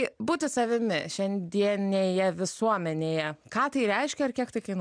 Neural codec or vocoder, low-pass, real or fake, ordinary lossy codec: none; 9.9 kHz; real; MP3, 96 kbps